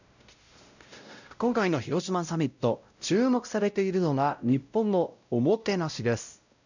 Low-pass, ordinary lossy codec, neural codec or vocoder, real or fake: 7.2 kHz; none; codec, 16 kHz, 0.5 kbps, X-Codec, WavLM features, trained on Multilingual LibriSpeech; fake